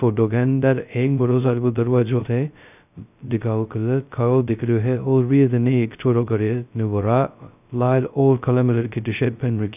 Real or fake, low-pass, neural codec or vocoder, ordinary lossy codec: fake; 3.6 kHz; codec, 16 kHz, 0.2 kbps, FocalCodec; none